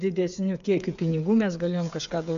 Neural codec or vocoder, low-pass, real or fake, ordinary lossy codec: codec, 16 kHz, 8 kbps, FreqCodec, smaller model; 7.2 kHz; fake; AAC, 96 kbps